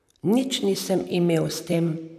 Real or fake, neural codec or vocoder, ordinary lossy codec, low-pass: fake; vocoder, 44.1 kHz, 128 mel bands, Pupu-Vocoder; none; 14.4 kHz